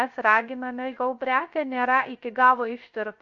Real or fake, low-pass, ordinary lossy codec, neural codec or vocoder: fake; 7.2 kHz; MP3, 48 kbps; codec, 16 kHz, 0.3 kbps, FocalCodec